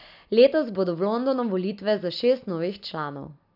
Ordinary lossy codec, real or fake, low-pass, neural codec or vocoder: none; real; 5.4 kHz; none